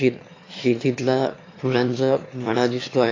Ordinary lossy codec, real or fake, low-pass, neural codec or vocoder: AAC, 32 kbps; fake; 7.2 kHz; autoencoder, 22.05 kHz, a latent of 192 numbers a frame, VITS, trained on one speaker